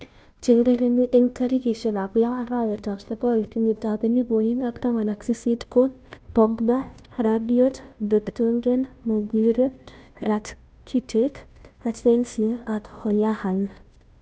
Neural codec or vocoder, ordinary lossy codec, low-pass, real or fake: codec, 16 kHz, 0.5 kbps, FunCodec, trained on Chinese and English, 25 frames a second; none; none; fake